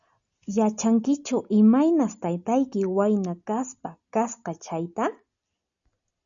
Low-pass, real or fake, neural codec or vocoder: 7.2 kHz; real; none